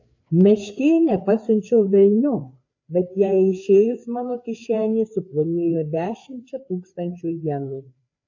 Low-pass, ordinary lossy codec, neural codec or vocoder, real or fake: 7.2 kHz; AAC, 48 kbps; codec, 16 kHz, 4 kbps, FreqCodec, larger model; fake